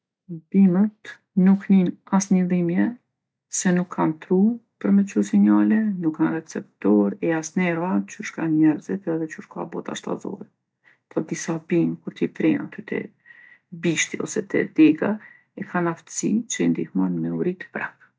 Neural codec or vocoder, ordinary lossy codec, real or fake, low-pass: none; none; real; none